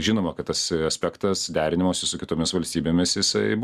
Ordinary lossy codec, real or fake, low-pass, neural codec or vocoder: Opus, 64 kbps; real; 14.4 kHz; none